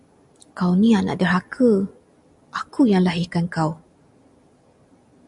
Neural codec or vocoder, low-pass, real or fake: none; 10.8 kHz; real